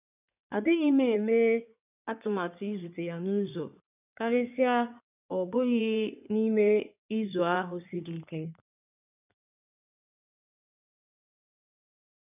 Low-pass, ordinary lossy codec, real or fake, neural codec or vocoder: 3.6 kHz; none; fake; codec, 16 kHz in and 24 kHz out, 2.2 kbps, FireRedTTS-2 codec